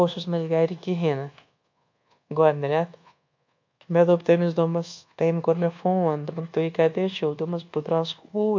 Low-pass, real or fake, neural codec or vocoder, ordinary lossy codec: 7.2 kHz; fake; codec, 24 kHz, 1.2 kbps, DualCodec; MP3, 48 kbps